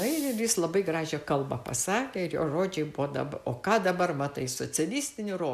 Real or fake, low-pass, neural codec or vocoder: real; 14.4 kHz; none